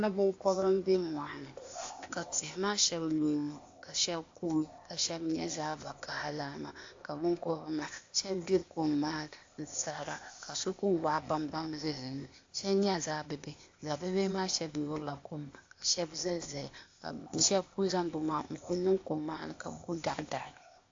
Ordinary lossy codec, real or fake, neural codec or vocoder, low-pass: AAC, 48 kbps; fake; codec, 16 kHz, 0.8 kbps, ZipCodec; 7.2 kHz